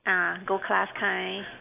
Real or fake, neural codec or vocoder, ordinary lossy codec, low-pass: real; none; AAC, 32 kbps; 3.6 kHz